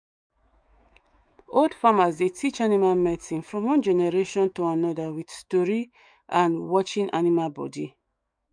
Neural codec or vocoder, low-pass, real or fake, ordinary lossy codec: autoencoder, 48 kHz, 128 numbers a frame, DAC-VAE, trained on Japanese speech; 9.9 kHz; fake; none